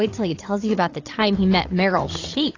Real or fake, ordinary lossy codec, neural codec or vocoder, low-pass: fake; AAC, 32 kbps; codec, 16 kHz, 8 kbps, FreqCodec, larger model; 7.2 kHz